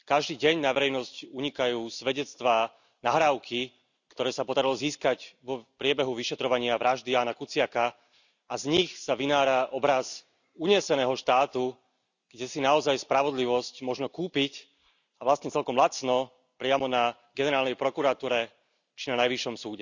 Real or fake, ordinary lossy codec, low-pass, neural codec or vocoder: real; none; 7.2 kHz; none